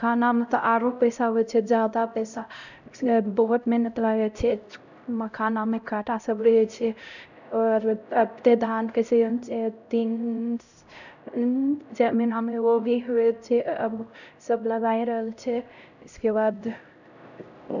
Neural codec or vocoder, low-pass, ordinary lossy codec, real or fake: codec, 16 kHz, 0.5 kbps, X-Codec, HuBERT features, trained on LibriSpeech; 7.2 kHz; none; fake